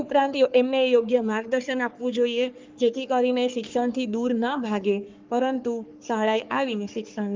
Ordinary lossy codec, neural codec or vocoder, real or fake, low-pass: Opus, 32 kbps; codec, 44.1 kHz, 3.4 kbps, Pupu-Codec; fake; 7.2 kHz